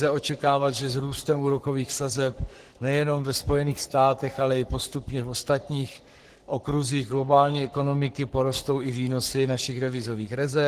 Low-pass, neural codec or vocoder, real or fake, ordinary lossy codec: 14.4 kHz; codec, 44.1 kHz, 3.4 kbps, Pupu-Codec; fake; Opus, 16 kbps